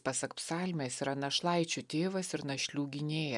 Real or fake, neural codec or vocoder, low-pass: real; none; 10.8 kHz